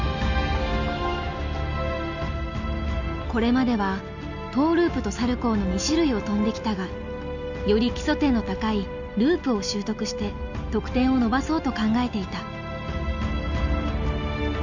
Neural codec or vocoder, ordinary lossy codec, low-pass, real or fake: none; none; 7.2 kHz; real